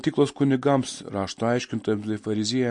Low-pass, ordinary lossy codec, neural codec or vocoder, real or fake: 10.8 kHz; MP3, 48 kbps; none; real